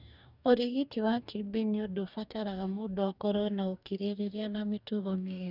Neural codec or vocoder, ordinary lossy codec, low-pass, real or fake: codec, 44.1 kHz, 2.6 kbps, DAC; none; 5.4 kHz; fake